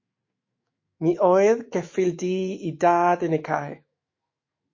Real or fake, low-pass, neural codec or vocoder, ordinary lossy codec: fake; 7.2 kHz; autoencoder, 48 kHz, 128 numbers a frame, DAC-VAE, trained on Japanese speech; MP3, 32 kbps